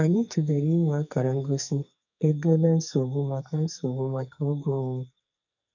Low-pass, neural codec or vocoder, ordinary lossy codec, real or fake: 7.2 kHz; codec, 44.1 kHz, 2.6 kbps, SNAC; none; fake